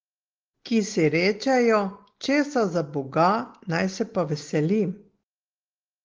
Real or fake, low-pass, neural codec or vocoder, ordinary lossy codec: real; 7.2 kHz; none; Opus, 16 kbps